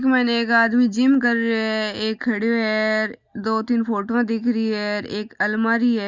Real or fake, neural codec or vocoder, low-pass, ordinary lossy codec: real; none; 7.2 kHz; Opus, 64 kbps